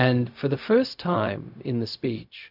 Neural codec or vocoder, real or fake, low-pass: codec, 16 kHz, 0.4 kbps, LongCat-Audio-Codec; fake; 5.4 kHz